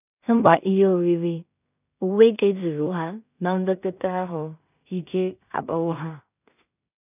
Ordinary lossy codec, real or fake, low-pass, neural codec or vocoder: none; fake; 3.6 kHz; codec, 16 kHz in and 24 kHz out, 0.4 kbps, LongCat-Audio-Codec, two codebook decoder